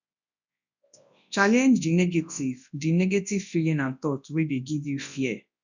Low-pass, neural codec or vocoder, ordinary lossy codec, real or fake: 7.2 kHz; codec, 24 kHz, 0.9 kbps, WavTokenizer, large speech release; none; fake